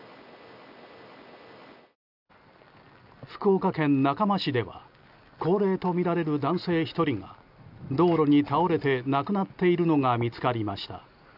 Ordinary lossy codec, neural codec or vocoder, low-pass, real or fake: none; none; 5.4 kHz; real